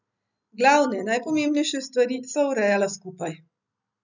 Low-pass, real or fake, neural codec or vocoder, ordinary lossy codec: 7.2 kHz; real; none; none